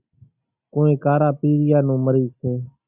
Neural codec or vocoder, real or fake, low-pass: none; real; 3.6 kHz